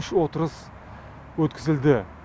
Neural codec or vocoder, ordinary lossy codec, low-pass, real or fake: none; none; none; real